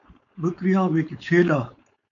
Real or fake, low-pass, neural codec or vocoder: fake; 7.2 kHz; codec, 16 kHz, 4.8 kbps, FACodec